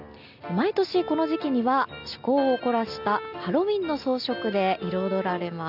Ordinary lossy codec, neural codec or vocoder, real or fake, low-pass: none; none; real; 5.4 kHz